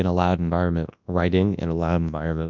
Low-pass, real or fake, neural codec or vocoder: 7.2 kHz; fake; codec, 24 kHz, 0.9 kbps, WavTokenizer, large speech release